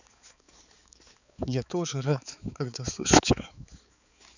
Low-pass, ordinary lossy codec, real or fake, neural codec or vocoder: 7.2 kHz; none; fake; codec, 16 kHz, 4 kbps, X-Codec, HuBERT features, trained on balanced general audio